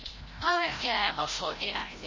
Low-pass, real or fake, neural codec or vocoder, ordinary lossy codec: 7.2 kHz; fake; codec, 16 kHz, 0.5 kbps, FreqCodec, larger model; MP3, 32 kbps